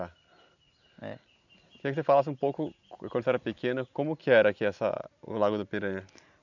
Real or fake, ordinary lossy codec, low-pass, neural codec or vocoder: real; none; 7.2 kHz; none